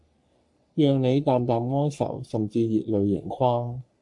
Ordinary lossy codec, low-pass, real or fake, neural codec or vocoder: AAC, 64 kbps; 10.8 kHz; fake; codec, 44.1 kHz, 3.4 kbps, Pupu-Codec